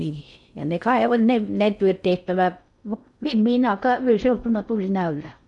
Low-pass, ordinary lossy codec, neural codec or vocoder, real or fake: 10.8 kHz; none; codec, 16 kHz in and 24 kHz out, 0.6 kbps, FocalCodec, streaming, 4096 codes; fake